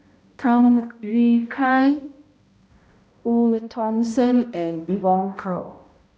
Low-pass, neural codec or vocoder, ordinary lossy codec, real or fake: none; codec, 16 kHz, 0.5 kbps, X-Codec, HuBERT features, trained on balanced general audio; none; fake